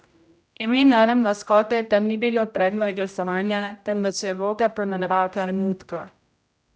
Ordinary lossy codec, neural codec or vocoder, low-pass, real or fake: none; codec, 16 kHz, 0.5 kbps, X-Codec, HuBERT features, trained on general audio; none; fake